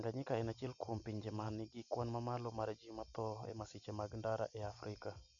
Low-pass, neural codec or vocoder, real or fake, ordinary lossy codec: 7.2 kHz; none; real; none